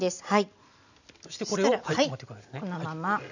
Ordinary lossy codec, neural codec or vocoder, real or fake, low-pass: none; none; real; 7.2 kHz